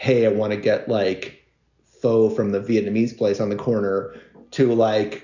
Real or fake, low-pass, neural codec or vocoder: real; 7.2 kHz; none